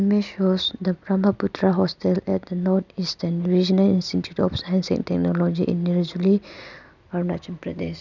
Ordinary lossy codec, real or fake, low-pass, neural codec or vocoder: none; real; 7.2 kHz; none